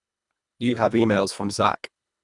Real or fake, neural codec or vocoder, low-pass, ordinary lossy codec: fake; codec, 24 kHz, 1.5 kbps, HILCodec; 10.8 kHz; none